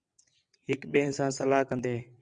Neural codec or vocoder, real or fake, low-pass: vocoder, 22.05 kHz, 80 mel bands, WaveNeXt; fake; 9.9 kHz